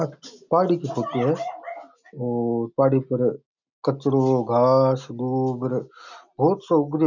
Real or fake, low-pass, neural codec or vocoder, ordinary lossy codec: real; 7.2 kHz; none; none